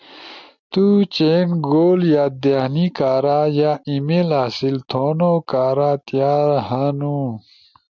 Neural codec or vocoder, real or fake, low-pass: none; real; 7.2 kHz